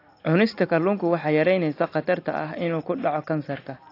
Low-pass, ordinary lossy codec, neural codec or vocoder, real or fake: 5.4 kHz; AAC, 32 kbps; none; real